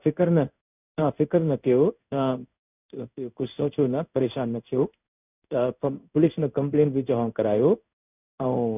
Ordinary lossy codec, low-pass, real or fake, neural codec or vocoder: none; 3.6 kHz; fake; codec, 16 kHz in and 24 kHz out, 1 kbps, XY-Tokenizer